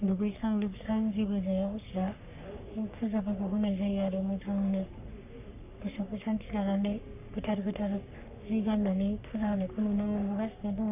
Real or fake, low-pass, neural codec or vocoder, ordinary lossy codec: fake; 3.6 kHz; codec, 44.1 kHz, 3.4 kbps, Pupu-Codec; none